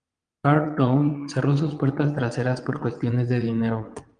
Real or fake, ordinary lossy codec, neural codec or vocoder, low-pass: fake; Opus, 32 kbps; vocoder, 22.05 kHz, 80 mel bands, WaveNeXt; 9.9 kHz